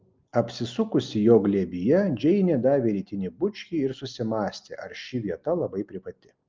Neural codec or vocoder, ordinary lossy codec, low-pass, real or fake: none; Opus, 32 kbps; 7.2 kHz; real